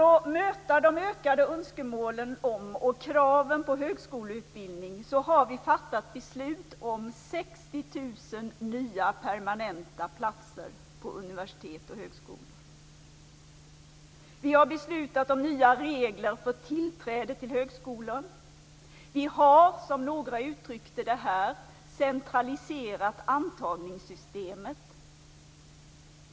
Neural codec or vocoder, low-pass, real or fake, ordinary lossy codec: none; none; real; none